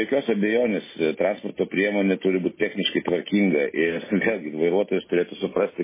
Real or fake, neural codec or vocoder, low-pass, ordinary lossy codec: real; none; 3.6 kHz; MP3, 16 kbps